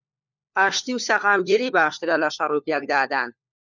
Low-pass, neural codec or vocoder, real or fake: 7.2 kHz; codec, 16 kHz, 4 kbps, FunCodec, trained on LibriTTS, 50 frames a second; fake